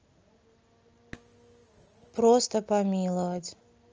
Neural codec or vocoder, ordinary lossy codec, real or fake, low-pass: none; Opus, 24 kbps; real; 7.2 kHz